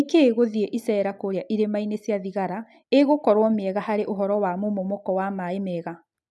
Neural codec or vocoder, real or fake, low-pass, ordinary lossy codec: none; real; none; none